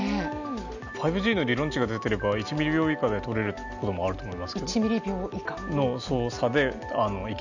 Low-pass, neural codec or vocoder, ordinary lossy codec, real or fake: 7.2 kHz; none; none; real